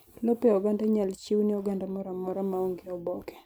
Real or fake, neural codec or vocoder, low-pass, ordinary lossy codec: real; none; none; none